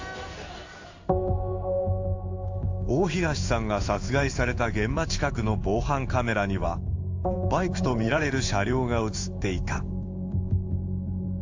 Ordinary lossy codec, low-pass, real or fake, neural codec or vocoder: AAC, 48 kbps; 7.2 kHz; fake; codec, 16 kHz in and 24 kHz out, 1 kbps, XY-Tokenizer